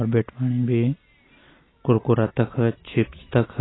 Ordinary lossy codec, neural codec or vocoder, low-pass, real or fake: AAC, 16 kbps; none; 7.2 kHz; real